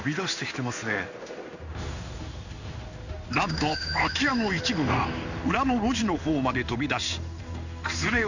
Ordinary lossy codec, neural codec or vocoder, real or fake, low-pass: none; codec, 16 kHz in and 24 kHz out, 1 kbps, XY-Tokenizer; fake; 7.2 kHz